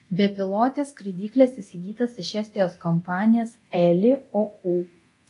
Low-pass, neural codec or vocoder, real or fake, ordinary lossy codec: 10.8 kHz; codec, 24 kHz, 0.9 kbps, DualCodec; fake; AAC, 48 kbps